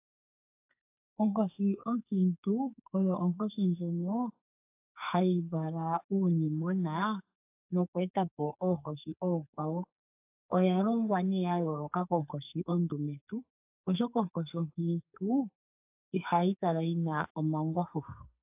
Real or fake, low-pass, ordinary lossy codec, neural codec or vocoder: fake; 3.6 kHz; AAC, 32 kbps; codec, 44.1 kHz, 2.6 kbps, SNAC